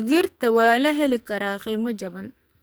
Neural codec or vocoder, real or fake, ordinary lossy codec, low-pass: codec, 44.1 kHz, 2.6 kbps, SNAC; fake; none; none